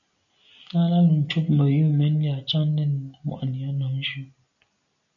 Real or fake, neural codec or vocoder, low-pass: real; none; 7.2 kHz